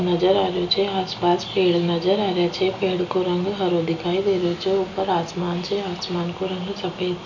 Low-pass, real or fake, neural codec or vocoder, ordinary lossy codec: 7.2 kHz; real; none; none